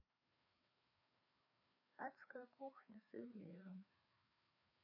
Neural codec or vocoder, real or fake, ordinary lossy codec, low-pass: codec, 16 kHz, 2 kbps, FreqCodec, larger model; fake; AAC, 48 kbps; 5.4 kHz